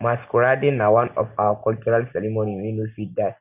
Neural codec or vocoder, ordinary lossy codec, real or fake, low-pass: none; none; real; 3.6 kHz